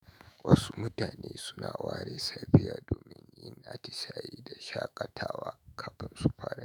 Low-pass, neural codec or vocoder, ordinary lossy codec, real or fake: none; autoencoder, 48 kHz, 128 numbers a frame, DAC-VAE, trained on Japanese speech; none; fake